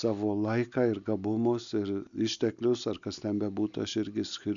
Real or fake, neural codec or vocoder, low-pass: real; none; 7.2 kHz